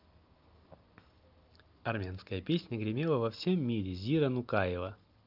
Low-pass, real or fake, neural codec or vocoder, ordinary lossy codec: 5.4 kHz; real; none; Opus, 24 kbps